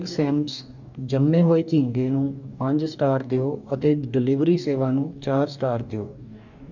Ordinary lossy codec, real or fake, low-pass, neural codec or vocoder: none; fake; 7.2 kHz; codec, 44.1 kHz, 2.6 kbps, DAC